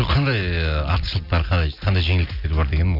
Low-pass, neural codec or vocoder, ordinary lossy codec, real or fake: 5.4 kHz; none; none; real